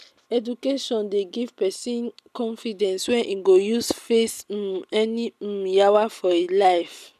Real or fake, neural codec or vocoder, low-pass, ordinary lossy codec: real; none; 14.4 kHz; none